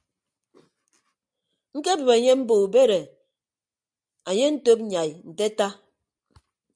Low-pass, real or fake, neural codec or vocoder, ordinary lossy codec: 9.9 kHz; real; none; AAC, 64 kbps